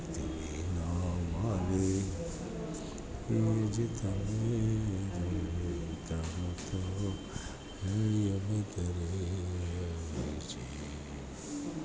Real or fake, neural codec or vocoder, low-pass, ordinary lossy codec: real; none; none; none